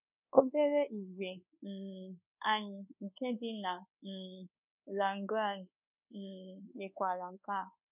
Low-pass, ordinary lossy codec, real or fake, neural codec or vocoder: 3.6 kHz; none; fake; codec, 24 kHz, 1.2 kbps, DualCodec